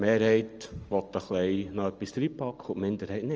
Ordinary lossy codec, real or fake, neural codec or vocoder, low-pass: Opus, 24 kbps; real; none; 7.2 kHz